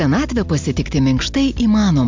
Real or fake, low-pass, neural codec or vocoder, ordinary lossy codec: real; 7.2 kHz; none; MP3, 64 kbps